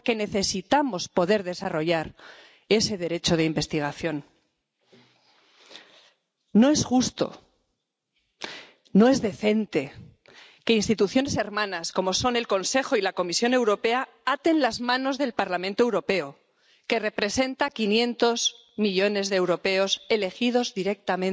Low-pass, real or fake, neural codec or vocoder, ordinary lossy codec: none; real; none; none